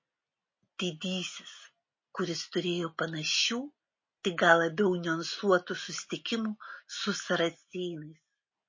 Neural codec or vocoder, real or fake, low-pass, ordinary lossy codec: none; real; 7.2 kHz; MP3, 32 kbps